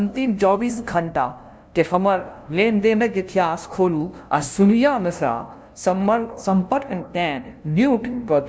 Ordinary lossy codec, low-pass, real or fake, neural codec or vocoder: none; none; fake; codec, 16 kHz, 0.5 kbps, FunCodec, trained on LibriTTS, 25 frames a second